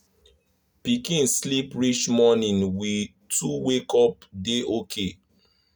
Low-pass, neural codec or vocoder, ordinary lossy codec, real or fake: none; none; none; real